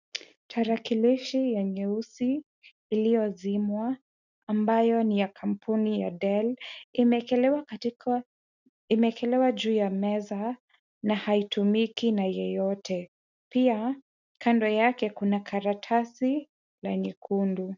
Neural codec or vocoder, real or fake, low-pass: none; real; 7.2 kHz